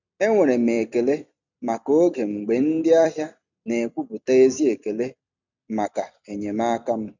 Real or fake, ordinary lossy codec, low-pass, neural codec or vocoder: fake; none; 7.2 kHz; vocoder, 44.1 kHz, 128 mel bands every 256 samples, BigVGAN v2